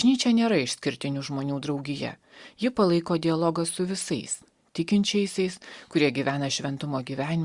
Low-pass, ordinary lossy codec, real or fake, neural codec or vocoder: 10.8 kHz; Opus, 64 kbps; real; none